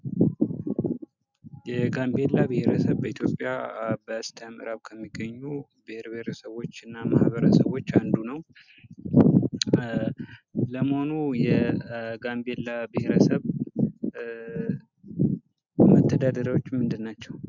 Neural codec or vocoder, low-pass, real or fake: none; 7.2 kHz; real